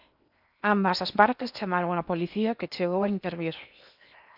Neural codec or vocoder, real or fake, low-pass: codec, 16 kHz in and 24 kHz out, 0.8 kbps, FocalCodec, streaming, 65536 codes; fake; 5.4 kHz